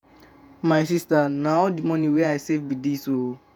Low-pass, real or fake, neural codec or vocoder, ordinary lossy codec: 19.8 kHz; fake; vocoder, 48 kHz, 128 mel bands, Vocos; none